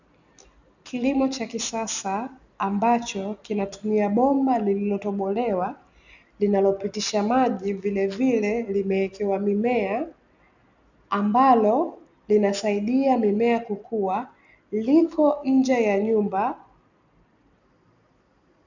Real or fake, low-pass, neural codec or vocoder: real; 7.2 kHz; none